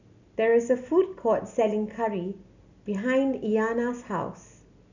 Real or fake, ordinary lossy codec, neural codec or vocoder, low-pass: real; none; none; 7.2 kHz